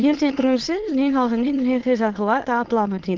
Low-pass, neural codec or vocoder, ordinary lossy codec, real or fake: 7.2 kHz; autoencoder, 22.05 kHz, a latent of 192 numbers a frame, VITS, trained on many speakers; Opus, 16 kbps; fake